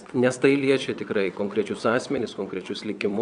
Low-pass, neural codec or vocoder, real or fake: 9.9 kHz; vocoder, 22.05 kHz, 80 mel bands, WaveNeXt; fake